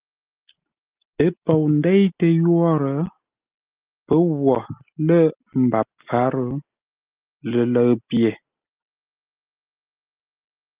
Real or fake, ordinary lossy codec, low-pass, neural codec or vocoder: real; Opus, 24 kbps; 3.6 kHz; none